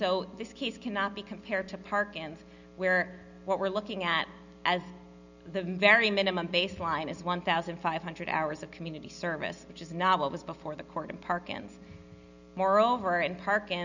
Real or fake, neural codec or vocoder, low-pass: real; none; 7.2 kHz